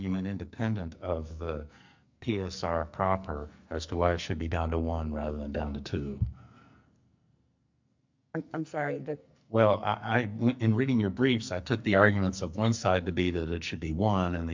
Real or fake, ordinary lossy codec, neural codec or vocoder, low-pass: fake; MP3, 64 kbps; codec, 44.1 kHz, 2.6 kbps, SNAC; 7.2 kHz